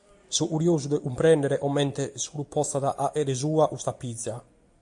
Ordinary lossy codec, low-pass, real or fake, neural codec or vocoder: AAC, 64 kbps; 10.8 kHz; real; none